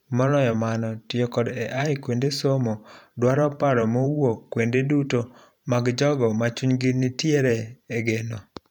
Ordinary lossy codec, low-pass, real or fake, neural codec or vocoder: none; 19.8 kHz; fake; vocoder, 44.1 kHz, 128 mel bands every 512 samples, BigVGAN v2